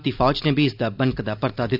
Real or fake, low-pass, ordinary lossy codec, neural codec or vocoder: real; 5.4 kHz; none; none